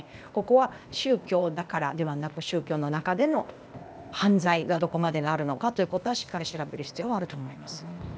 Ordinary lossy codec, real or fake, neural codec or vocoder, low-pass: none; fake; codec, 16 kHz, 0.8 kbps, ZipCodec; none